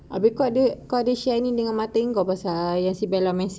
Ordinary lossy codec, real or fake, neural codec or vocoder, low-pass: none; real; none; none